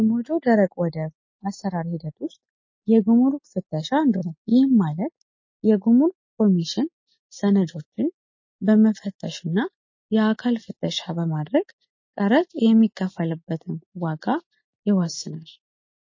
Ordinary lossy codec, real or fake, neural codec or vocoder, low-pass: MP3, 32 kbps; real; none; 7.2 kHz